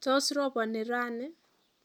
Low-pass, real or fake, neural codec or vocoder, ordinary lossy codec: 19.8 kHz; real; none; none